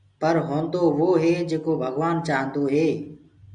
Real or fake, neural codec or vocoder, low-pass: real; none; 9.9 kHz